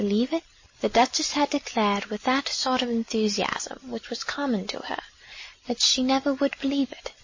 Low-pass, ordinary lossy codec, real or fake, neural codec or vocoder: 7.2 kHz; MP3, 32 kbps; real; none